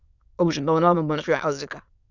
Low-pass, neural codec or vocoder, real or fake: 7.2 kHz; autoencoder, 22.05 kHz, a latent of 192 numbers a frame, VITS, trained on many speakers; fake